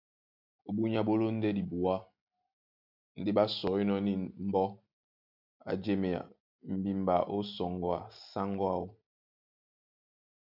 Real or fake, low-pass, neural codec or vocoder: real; 5.4 kHz; none